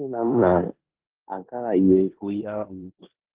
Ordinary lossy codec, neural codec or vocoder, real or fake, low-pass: Opus, 16 kbps; codec, 16 kHz in and 24 kHz out, 0.9 kbps, LongCat-Audio-Codec, four codebook decoder; fake; 3.6 kHz